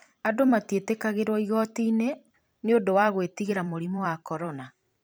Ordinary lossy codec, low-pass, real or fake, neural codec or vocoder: none; none; fake; vocoder, 44.1 kHz, 128 mel bands every 256 samples, BigVGAN v2